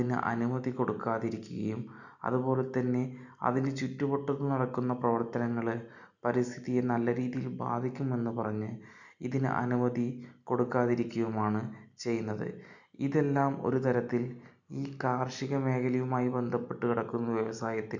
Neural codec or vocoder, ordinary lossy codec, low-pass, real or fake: none; none; 7.2 kHz; real